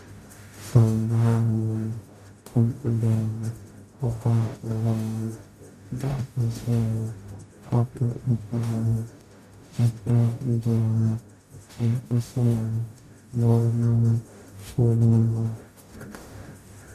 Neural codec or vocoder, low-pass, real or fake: codec, 44.1 kHz, 0.9 kbps, DAC; 14.4 kHz; fake